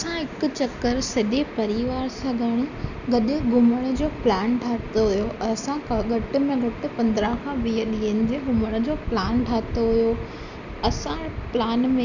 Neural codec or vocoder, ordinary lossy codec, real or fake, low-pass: none; none; real; 7.2 kHz